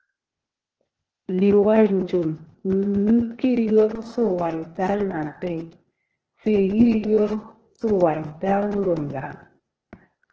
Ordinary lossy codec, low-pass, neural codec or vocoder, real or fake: Opus, 16 kbps; 7.2 kHz; codec, 16 kHz, 0.8 kbps, ZipCodec; fake